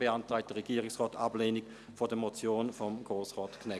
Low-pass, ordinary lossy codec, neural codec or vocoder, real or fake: none; none; none; real